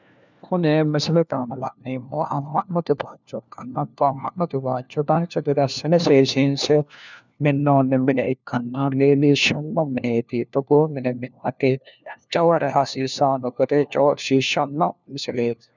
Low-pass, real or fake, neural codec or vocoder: 7.2 kHz; fake; codec, 16 kHz, 1 kbps, FunCodec, trained on LibriTTS, 50 frames a second